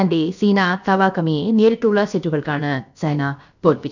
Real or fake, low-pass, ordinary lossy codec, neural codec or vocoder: fake; 7.2 kHz; none; codec, 16 kHz, about 1 kbps, DyCAST, with the encoder's durations